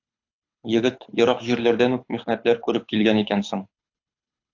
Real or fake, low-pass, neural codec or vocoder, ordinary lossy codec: fake; 7.2 kHz; codec, 24 kHz, 6 kbps, HILCodec; AAC, 48 kbps